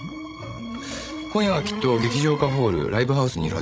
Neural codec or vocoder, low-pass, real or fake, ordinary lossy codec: codec, 16 kHz, 16 kbps, FreqCodec, larger model; none; fake; none